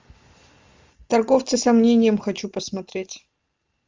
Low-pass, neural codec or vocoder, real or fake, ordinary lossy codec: 7.2 kHz; none; real; Opus, 32 kbps